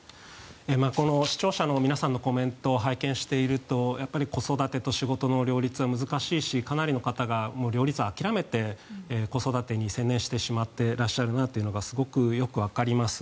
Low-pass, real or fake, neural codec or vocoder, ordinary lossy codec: none; real; none; none